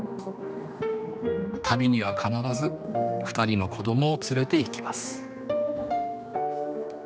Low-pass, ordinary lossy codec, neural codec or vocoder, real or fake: none; none; codec, 16 kHz, 2 kbps, X-Codec, HuBERT features, trained on general audio; fake